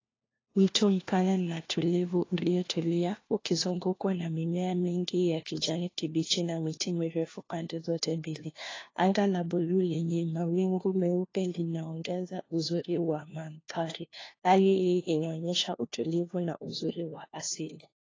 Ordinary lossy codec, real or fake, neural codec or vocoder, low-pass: AAC, 32 kbps; fake; codec, 16 kHz, 1 kbps, FunCodec, trained on LibriTTS, 50 frames a second; 7.2 kHz